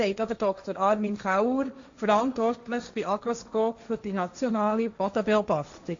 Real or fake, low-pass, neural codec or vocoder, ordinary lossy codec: fake; 7.2 kHz; codec, 16 kHz, 1.1 kbps, Voila-Tokenizer; AAC, 64 kbps